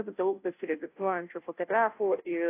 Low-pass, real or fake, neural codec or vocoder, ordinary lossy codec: 3.6 kHz; fake; codec, 16 kHz, 0.5 kbps, FunCodec, trained on Chinese and English, 25 frames a second; AAC, 24 kbps